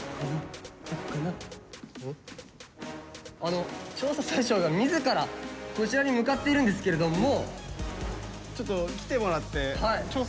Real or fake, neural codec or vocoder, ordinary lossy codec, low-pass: real; none; none; none